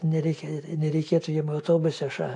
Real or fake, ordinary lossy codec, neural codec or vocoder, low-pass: real; AAC, 48 kbps; none; 10.8 kHz